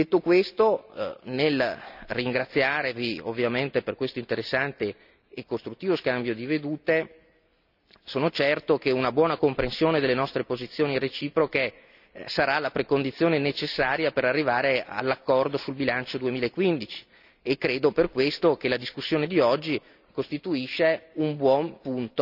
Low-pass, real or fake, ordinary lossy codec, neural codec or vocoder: 5.4 kHz; real; none; none